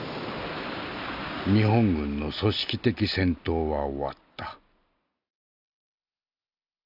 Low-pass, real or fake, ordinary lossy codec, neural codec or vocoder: 5.4 kHz; real; none; none